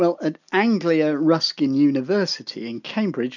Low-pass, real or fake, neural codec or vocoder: 7.2 kHz; real; none